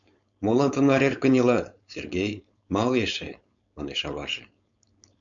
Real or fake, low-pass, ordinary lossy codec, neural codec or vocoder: fake; 7.2 kHz; MP3, 96 kbps; codec, 16 kHz, 4.8 kbps, FACodec